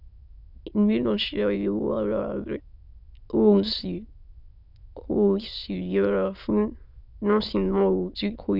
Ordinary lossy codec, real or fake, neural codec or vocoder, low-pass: none; fake; autoencoder, 22.05 kHz, a latent of 192 numbers a frame, VITS, trained on many speakers; 5.4 kHz